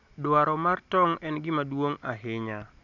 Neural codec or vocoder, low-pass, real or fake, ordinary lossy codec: none; 7.2 kHz; real; none